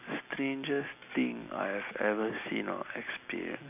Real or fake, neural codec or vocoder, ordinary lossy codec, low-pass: real; none; none; 3.6 kHz